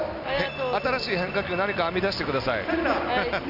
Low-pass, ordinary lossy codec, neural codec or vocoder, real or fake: 5.4 kHz; none; none; real